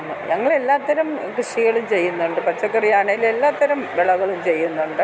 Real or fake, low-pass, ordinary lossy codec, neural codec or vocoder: real; none; none; none